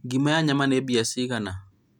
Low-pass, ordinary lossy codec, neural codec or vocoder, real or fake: 19.8 kHz; none; none; real